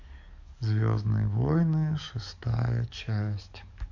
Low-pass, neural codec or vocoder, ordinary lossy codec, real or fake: 7.2 kHz; codec, 44.1 kHz, 7.8 kbps, DAC; none; fake